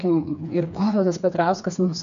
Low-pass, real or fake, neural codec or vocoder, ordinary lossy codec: 7.2 kHz; fake; codec, 16 kHz, 4 kbps, FreqCodec, smaller model; MP3, 96 kbps